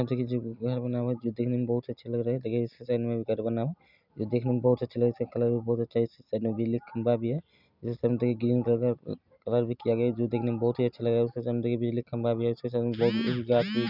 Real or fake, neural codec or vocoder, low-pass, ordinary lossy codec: real; none; 5.4 kHz; none